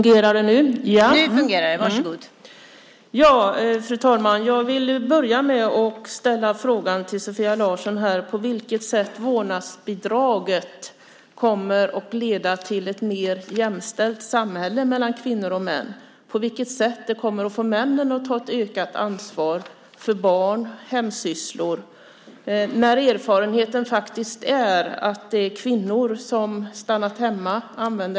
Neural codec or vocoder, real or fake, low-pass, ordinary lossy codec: none; real; none; none